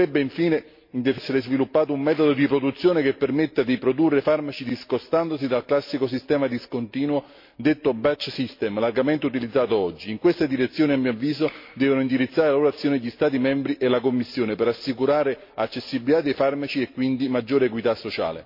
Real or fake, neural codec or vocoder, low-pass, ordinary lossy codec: real; none; 5.4 kHz; MP3, 32 kbps